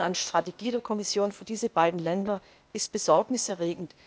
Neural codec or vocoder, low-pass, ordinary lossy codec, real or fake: codec, 16 kHz, 0.8 kbps, ZipCodec; none; none; fake